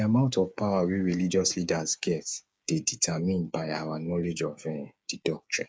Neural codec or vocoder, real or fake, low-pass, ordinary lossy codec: codec, 16 kHz, 8 kbps, FreqCodec, smaller model; fake; none; none